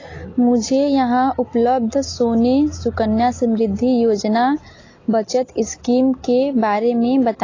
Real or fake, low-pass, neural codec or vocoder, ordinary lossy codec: real; 7.2 kHz; none; AAC, 32 kbps